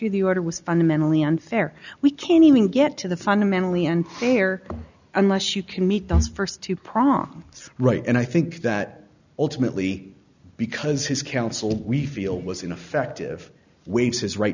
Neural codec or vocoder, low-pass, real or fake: none; 7.2 kHz; real